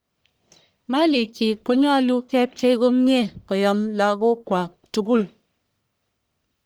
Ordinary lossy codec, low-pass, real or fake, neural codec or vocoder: none; none; fake; codec, 44.1 kHz, 1.7 kbps, Pupu-Codec